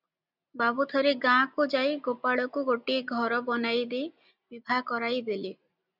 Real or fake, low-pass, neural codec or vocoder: real; 5.4 kHz; none